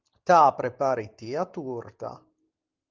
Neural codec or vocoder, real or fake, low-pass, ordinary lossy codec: none; real; 7.2 kHz; Opus, 24 kbps